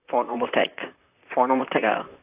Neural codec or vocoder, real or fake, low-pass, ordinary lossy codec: vocoder, 44.1 kHz, 128 mel bands, Pupu-Vocoder; fake; 3.6 kHz; AAC, 32 kbps